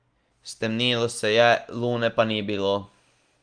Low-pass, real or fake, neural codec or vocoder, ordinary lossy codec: 9.9 kHz; real; none; Opus, 24 kbps